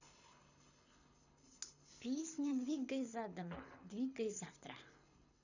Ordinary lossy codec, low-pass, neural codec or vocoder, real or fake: none; 7.2 kHz; codec, 24 kHz, 6 kbps, HILCodec; fake